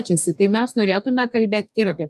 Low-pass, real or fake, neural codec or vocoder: 14.4 kHz; fake; codec, 44.1 kHz, 2.6 kbps, DAC